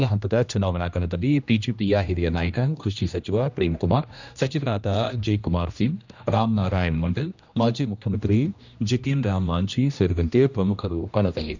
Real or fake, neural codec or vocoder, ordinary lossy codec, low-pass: fake; codec, 16 kHz, 1 kbps, X-Codec, HuBERT features, trained on general audio; none; 7.2 kHz